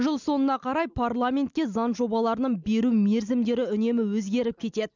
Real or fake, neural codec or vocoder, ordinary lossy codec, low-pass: real; none; none; 7.2 kHz